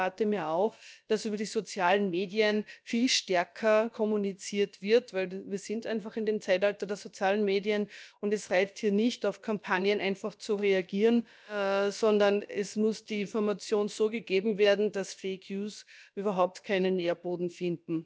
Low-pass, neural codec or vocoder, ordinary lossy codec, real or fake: none; codec, 16 kHz, about 1 kbps, DyCAST, with the encoder's durations; none; fake